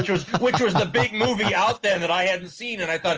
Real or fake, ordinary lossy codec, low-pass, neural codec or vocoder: real; Opus, 24 kbps; 7.2 kHz; none